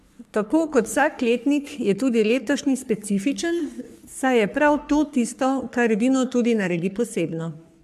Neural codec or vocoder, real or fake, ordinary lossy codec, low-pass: codec, 44.1 kHz, 3.4 kbps, Pupu-Codec; fake; AAC, 96 kbps; 14.4 kHz